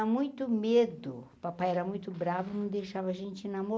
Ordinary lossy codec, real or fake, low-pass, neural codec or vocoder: none; real; none; none